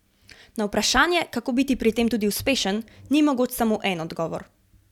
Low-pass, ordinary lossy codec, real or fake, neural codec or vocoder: 19.8 kHz; none; real; none